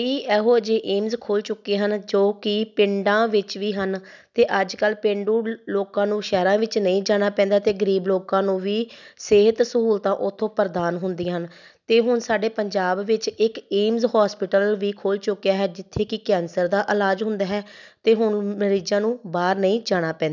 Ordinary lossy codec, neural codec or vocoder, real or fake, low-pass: none; none; real; 7.2 kHz